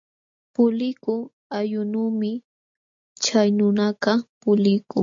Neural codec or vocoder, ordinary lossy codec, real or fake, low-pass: none; MP3, 48 kbps; real; 7.2 kHz